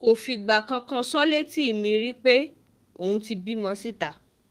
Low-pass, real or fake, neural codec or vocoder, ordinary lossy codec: 14.4 kHz; fake; codec, 32 kHz, 1.9 kbps, SNAC; Opus, 32 kbps